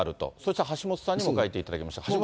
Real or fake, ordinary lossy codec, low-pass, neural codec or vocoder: real; none; none; none